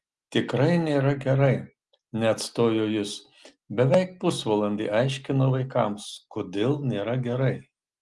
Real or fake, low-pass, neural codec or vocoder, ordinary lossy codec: real; 10.8 kHz; none; Opus, 24 kbps